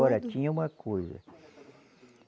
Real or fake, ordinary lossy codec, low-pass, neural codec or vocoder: real; none; none; none